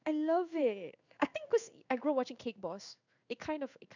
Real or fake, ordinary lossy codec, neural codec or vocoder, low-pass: fake; none; codec, 16 kHz in and 24 kHz out, 1 kbps, XY-Tokenizer; 7.2 kHz